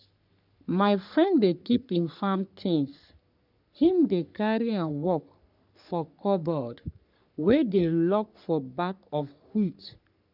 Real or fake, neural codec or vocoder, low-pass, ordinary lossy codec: fake; codec, 44.1 kHz, 3.4 kbps, Pupu-Codec; 5.4 kHz; none